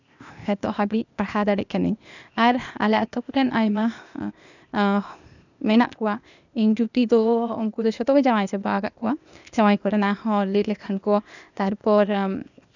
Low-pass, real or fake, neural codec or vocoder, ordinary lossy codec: 7.2 kHz; fake; codec, 16 kHz, 0.8 kbps, ZipCodec; none